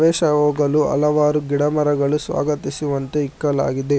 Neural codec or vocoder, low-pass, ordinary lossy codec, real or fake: none; none; none; real